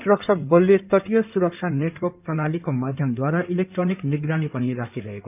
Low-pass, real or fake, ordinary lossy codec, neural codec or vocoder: 3.6 kHz; fake; none; codec, 16 kHz in and 24 kHz out, 2.2 kbps, FireRedTTS-2 codec